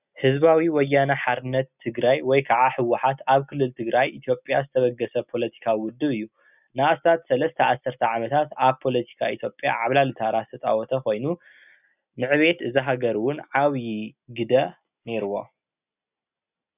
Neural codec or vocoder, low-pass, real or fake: none; 3.6 kHz; real